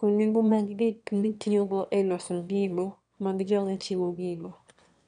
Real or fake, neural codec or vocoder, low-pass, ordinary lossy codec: fake; autoencoder, 22.05 kHz, a latent of 192 numbers a frame, VITS, trained on one speaker; 9.9 kHz; none